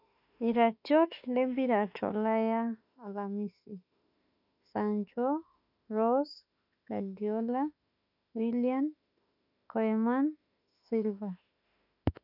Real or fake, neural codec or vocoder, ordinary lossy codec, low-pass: fake; autoencoder, 48 kHz, 32 numbers a frame, DAC-VAE, trained on Japanese speech; none; 5.4 kHz